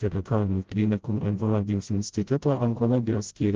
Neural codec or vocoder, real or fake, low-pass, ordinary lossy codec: codec, 16 kHz, 0.5 kbps, FreqCodec, smaller model; fake; 7.2 kHz; Opus, 16 kbps